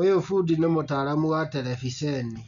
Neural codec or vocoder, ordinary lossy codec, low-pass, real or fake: none; none; 7.2 kHz; real